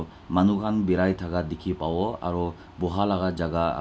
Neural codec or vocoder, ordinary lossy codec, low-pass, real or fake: none; none; none; real